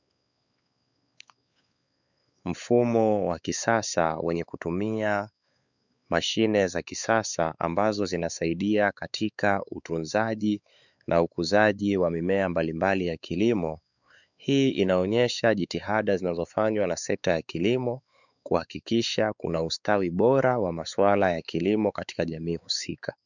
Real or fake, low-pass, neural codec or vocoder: fake; 7.2 kHz; codec, 16 kHz, 4 kbps, X-Codec, WavLM features, trained on Multilingual LibriSpeech